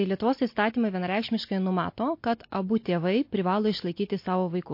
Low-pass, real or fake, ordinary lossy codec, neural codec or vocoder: 5.4 kHz; real; MP3, 32 kbps; none